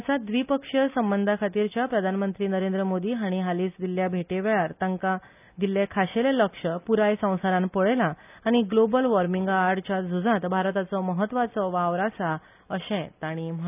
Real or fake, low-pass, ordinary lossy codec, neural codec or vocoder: real; 3.6 kHz; none; none